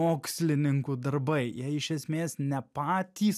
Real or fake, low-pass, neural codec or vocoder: real; 14.4 kHz; none